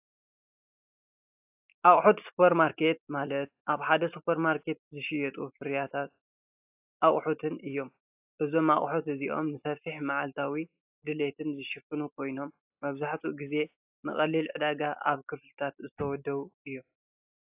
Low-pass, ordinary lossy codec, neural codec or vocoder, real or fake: 3.6 kHz; AAC, 32 kbps; none; real